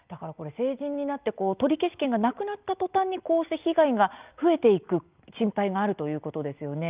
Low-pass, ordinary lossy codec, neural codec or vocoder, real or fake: 3.6 kHz; Opus, 24 kbps; none; real